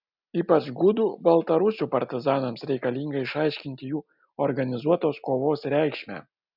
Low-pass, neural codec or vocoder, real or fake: 5.4 kHz; none; real